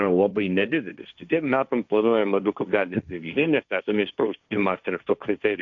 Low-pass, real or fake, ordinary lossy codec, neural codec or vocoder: 7.2 kHz; fake; MP3, 64 kbps; codec, 16 kHz, 1.1 kbps, Voila-Tokenizer